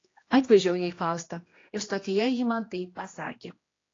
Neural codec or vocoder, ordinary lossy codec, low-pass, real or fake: codec, 16 kHz, 1 kbps, X-Codec, HuBERT features, trained on general audio; AAC, 32 kbps; 7.2 kHz; fake